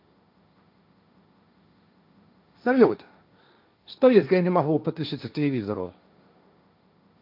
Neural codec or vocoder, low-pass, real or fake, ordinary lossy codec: codec, 16 kHz, 1.1 kbps, Voila-Tokenizer; 5.4 kHz; fake; none